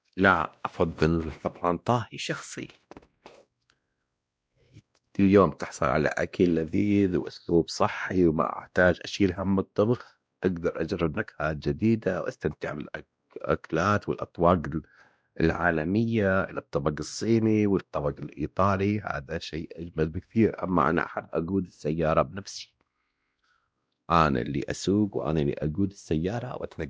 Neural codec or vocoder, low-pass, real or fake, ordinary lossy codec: codec, 16 kHz, 1 kbps, X-Codec, WavLM features, trained on Multilingual LibriSpeech; none; fake; none